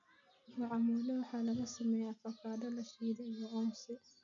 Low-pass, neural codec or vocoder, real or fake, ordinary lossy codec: 7.2 kHz; none; real; none